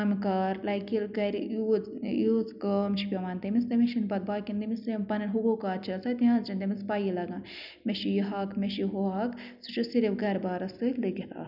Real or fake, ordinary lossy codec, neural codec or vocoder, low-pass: real; none; none; 5.4 kHz